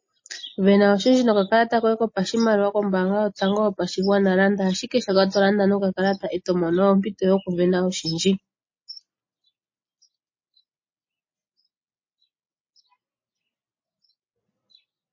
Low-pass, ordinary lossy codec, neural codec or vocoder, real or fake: 7.2 kHz; MP3, 32 kbps; none; real